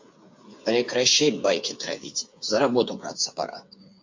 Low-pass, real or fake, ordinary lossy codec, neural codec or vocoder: 7.2 kHz; fake; MP3, 48 kbps; codec, 16 kHz, 8 kbps, FreqCodec, smaller model